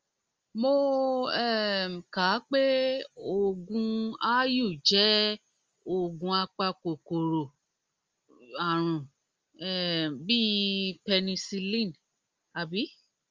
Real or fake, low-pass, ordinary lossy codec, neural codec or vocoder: real; 7.2 kHz; Opus, 32 kbps; none